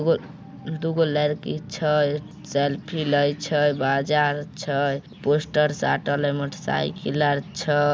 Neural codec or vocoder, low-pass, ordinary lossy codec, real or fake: none; none; none; real